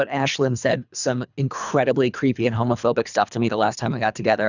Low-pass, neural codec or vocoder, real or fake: 7.2 kHz; codec, 24 kHz, 3 kbps, HILCodec; fake